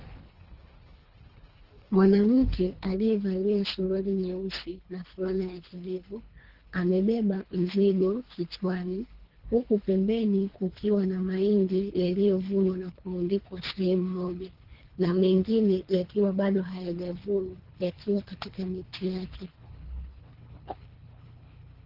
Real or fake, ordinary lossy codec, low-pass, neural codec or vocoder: fake; Opus, 16 kbps; 5.4 kHz; codec, 24 kHz, 3 kbps, HILCodec